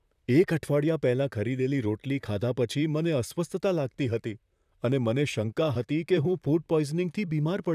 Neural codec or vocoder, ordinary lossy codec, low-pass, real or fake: vocoder, 44.1 kHz, 128 mel bands, Pupu-Vocoder; none; 14.4 kHz; fake